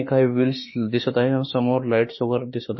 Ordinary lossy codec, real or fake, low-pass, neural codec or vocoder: MP3, 24 kbps; fake; 7.2 kHz; autoencoder, 48 kHz, 32 numbers a frame, DAC-VAE, trained on Japanese speech